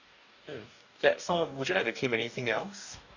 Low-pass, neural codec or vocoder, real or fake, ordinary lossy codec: 7.2 kHz; codec, 44.1 kHz, 2.6 kbps, DAC; fake; none